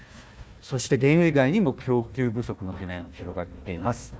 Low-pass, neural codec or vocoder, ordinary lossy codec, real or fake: none; codec, 16 kHz, 1 kbps, FunCodec, trained on Chinese and English, 50 frames a second; none; fake